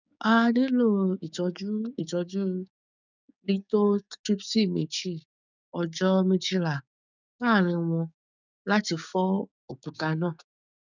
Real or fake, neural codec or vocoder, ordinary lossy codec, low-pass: fake; codec, 16 kHz, 6 kbps, DAC; none; 7.2 kHz